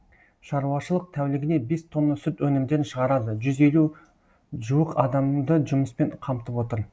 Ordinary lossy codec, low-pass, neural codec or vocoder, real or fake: none; none; none; real